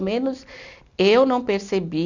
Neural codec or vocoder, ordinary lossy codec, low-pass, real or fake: none; none; 7.2 kHz; real